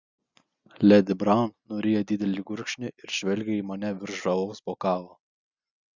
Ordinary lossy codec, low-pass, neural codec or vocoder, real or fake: Opus, 64 kbps; 7.2 kHz; none; real